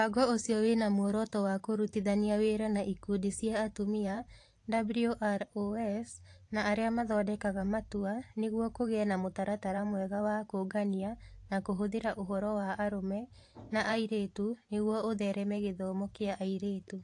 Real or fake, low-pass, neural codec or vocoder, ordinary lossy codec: fake; 10.8 kHz; vocoder, 44.1 kHz, 128 mel bands every 512 samples, BigVGAN v2; AAC, 48 kbps